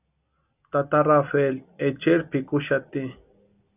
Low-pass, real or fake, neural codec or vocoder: 3.6 kHz; real; none